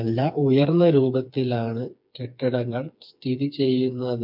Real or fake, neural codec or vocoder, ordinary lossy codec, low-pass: fake; codec, 16 kHz, 4 kbps, FreqCodec, smaller model; MP3, 48 kbps; 5.4 kHz